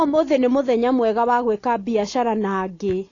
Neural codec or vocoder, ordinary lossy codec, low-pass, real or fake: none; AAC, 32 kbps; 7.2 kHz; real